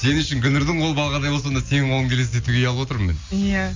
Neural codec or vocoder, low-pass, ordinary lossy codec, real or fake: none; 7.2 kHz; MP3, 64 kbps; real